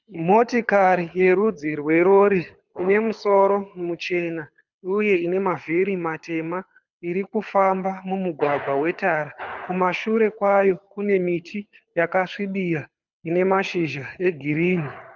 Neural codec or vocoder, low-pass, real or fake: codec, 24 kHz, 6 kbps, HILCodec; 7.2 kHz; fake